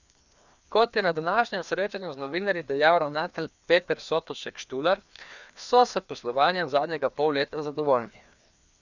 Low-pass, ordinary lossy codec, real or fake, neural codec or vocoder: 7.2 kHz; none; fake; codec, 16 kHz, 2 kbps, FreqCodec, larger model